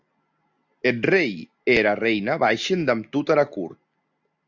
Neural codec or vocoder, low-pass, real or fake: none; 7.2 kHz; real